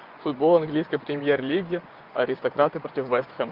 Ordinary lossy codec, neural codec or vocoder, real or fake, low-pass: Opus, 24 kbps; vocoder, 24 kHz, 100 mel bands, Vocos; fake; 5.4 kHz